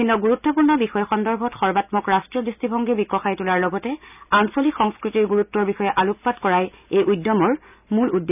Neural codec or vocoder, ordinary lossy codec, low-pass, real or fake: none; none; 3.6 kHz; real